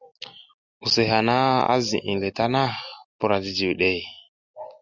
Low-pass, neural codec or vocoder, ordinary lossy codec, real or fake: 7.2 kHz; none; Opus, 64 kbps; real